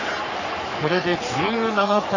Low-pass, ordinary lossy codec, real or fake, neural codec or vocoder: 7.2 kHz; none; fake; codec, 44.1 kHz, 3.4 kbps, Pupu-Codec